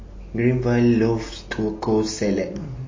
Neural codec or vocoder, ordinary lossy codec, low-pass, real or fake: none; MP3, 32 kbps; 7.2 kHz; real